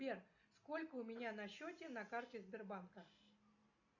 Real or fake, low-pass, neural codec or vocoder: real; 7.2 kHz; none